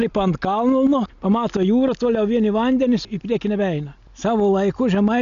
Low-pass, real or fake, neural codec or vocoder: 7.2 kHz; real; none